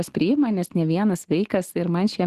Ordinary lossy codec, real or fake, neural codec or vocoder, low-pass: Opus, 16 kbps; real; none; 14.4 kHz